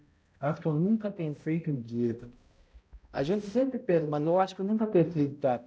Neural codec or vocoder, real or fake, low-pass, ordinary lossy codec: codec, 16 kHz, 0.5 kbps, X-Codec, HuBERT features, trained on balanced general audio; fake; none; none